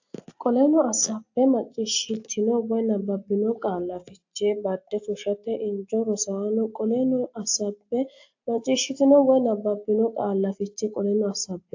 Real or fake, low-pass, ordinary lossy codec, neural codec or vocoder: real; 7.2 kHz; AAC, 48 kbps; none